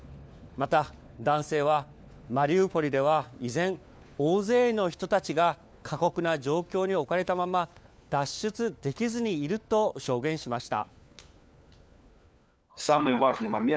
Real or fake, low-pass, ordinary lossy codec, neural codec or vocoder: fake; none; none; codec, 16 kHz, 4 kbps, FunCodec, trained on LibriTTS, 50 frames a second